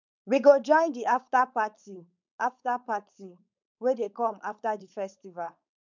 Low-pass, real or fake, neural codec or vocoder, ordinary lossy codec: 7.2 kHz; fake; codec, 16 kHz, 4.8 kbps, FACodec; none